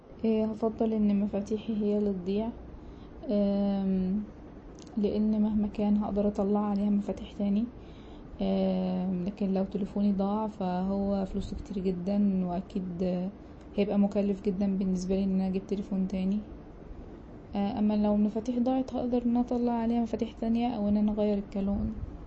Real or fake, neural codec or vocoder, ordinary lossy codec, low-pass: real; none; MP3, 32 kbps; 9.9 kHz